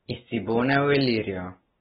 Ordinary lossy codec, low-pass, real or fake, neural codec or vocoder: AAC, 16 kbps; 7.2 kHz; real; none